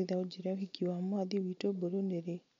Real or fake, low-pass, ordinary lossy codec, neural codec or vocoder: real; 7.2 kHz; MP3, 48 kbps; none